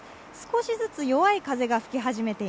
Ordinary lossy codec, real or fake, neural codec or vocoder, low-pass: none; real; none; none